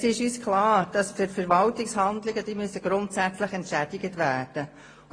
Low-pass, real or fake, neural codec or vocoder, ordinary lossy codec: 9.9 kHz; real; none; AAC, 32 kbps